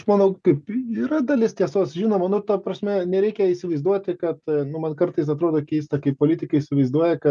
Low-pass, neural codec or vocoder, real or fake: 10.8 kHz; none; real